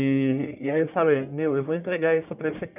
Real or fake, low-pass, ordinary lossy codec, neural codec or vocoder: fake; 3.6 kHz; none; codec, 44.1 kHz, 1.7 kbps, Pupu-Codec